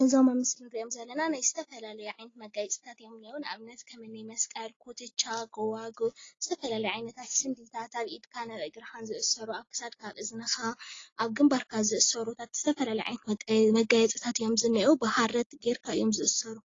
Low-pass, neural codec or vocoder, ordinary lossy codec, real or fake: 7.2 kHz; none; AAC, 32 kbps; real